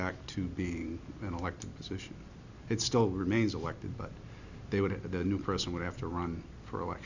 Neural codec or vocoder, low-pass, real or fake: none; 7.2 kHz; real